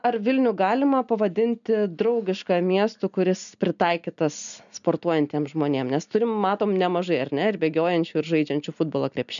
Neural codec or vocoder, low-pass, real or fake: none; 7.2 kHz; real